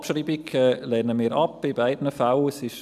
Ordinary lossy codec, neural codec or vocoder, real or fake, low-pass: none; none; real; 14.4 kHz